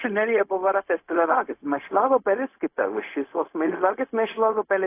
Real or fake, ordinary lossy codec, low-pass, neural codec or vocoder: fake; AAC, 24 kbps; 3.6 kHz; codec, 16 kHz, 0.4 kbps, LongCat-Audio-Codec